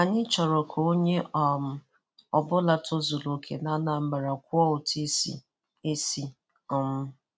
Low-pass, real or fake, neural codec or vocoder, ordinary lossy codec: none; real; none; none